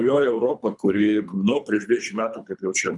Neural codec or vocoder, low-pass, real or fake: codec, 24 kHz, 3 kbps, HILCodec; 10.8 kHz; fake